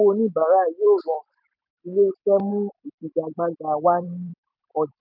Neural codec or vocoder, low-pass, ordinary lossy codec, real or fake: vocoder, 44.1 kHz, 128 mel bands every 256 samples, BigVGAN v2; 5.4 kHz; none; fake